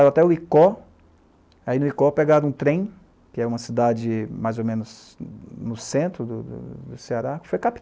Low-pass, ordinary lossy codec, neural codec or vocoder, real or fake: none; none; none; real